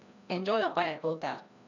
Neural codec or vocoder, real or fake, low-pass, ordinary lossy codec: codec, 16 kHz, 1 kbps, FreqCodec, larger model; fake; 7.2 kHz; none